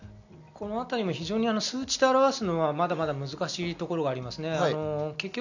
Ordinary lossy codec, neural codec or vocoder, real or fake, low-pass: none; none; real; 7.2 kHz